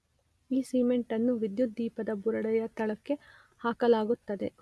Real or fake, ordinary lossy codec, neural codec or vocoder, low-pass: real; none; none; none